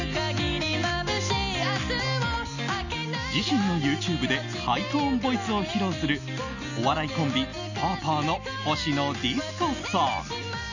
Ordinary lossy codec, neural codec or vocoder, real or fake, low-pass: none; none; real; 7.2 kHz